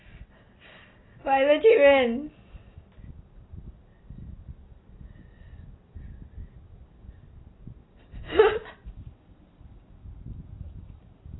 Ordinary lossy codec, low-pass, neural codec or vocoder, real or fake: AAC, 16 kbps; 7.2 kHz; none; real